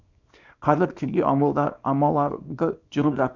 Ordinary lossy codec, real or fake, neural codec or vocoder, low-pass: none; fake; codec, 24 kHz, 0.9 kbps, WavTokenizer, small release; 7.2 kHz